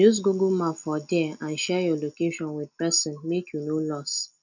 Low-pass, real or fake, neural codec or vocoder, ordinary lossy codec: 7.2 kHz; real; none; none